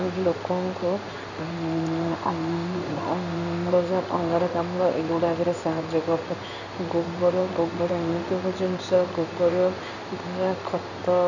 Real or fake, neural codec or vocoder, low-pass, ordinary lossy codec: fake; codec, 16 kHz in and 24 kHz out, 1 kbps, XY-Tokenizer; 7.2 kHz; none